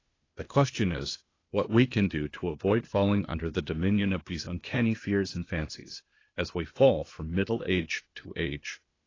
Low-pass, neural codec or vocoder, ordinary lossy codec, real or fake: 7.2 kHz; codec, 16 kHz, 0.8 kbps, ZipCodec; AAC, 32 kbps; fake